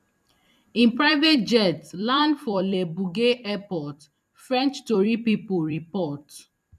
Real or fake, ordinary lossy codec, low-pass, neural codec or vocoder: fake; none; 14.4 kHz; vocoder, 44.1 kHz, 128 mel bands every 256 samples, BigVGAN v2